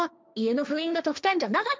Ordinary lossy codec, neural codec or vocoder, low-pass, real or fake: none; codec, 16 kHz, 1.1 kbps, Voila-Tokenizer; none; fake